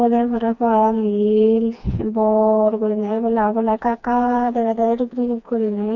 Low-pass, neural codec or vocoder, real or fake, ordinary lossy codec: 7.2 kHz; codec, 16 kHz, 2 kbps, FreqCodec, smaller model; fake; none